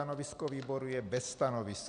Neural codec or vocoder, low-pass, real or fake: none; 9.9 kHz; real